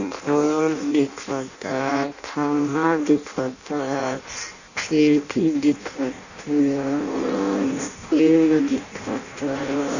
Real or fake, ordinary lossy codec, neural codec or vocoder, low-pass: fake; none; codec, 16 kHz in and 24 kHz out, 0.6 kbps, FireRedTTS-2 codec; 7.2 kHz